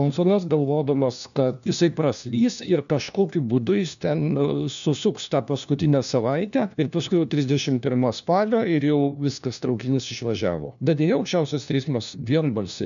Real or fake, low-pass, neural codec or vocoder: fake; 7.2 kHz; codec, 16 kHz, 1 kbps, FunCodec, trained on LibriTTS, 50 frames a second